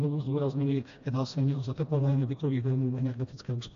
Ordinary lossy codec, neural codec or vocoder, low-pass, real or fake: AAC, 48 kbps; codec, 16 kHz, 1 kbps, FreqCodec, smaller model; 7.2 kHz; fake